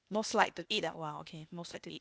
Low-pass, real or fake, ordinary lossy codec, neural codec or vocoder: none; fake; none; codec, 16 kHz, 0.8 kbps, ZipCodec